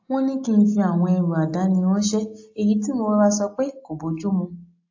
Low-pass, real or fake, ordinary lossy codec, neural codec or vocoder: 7.2 kHz; real; AAC, 48 kbps; none